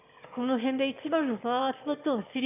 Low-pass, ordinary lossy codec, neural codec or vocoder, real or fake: 3.6 kHz; none; autoencoder, 22.05 kHz, a latent of 192 numbers a frame, VITS, trained on one speaker; fake